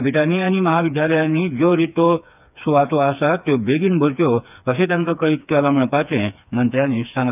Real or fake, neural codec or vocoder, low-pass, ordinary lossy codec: fake; codec, 16 kHz, 4 kbps, FreqCodec, smaller model; 3.6 kHz; none